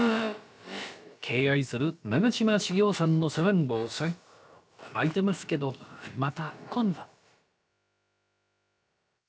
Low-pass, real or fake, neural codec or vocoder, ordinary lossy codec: none; fake; codec, 16 kHz, about 1 kbps, DyCAST, with the encoder's durations; none